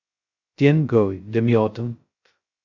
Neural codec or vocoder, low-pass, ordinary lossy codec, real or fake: codec, 16 kHz, 0.2 kbps, FocalCodec; 7.2 kHz; Opus, 64 kbps; fake